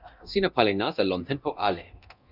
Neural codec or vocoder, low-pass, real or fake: codec, 24 kHz, 0.9 kbps, DualCodec; 5.4 kHz; fake